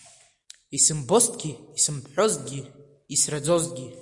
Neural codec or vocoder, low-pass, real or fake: none; 10.8 kHz; real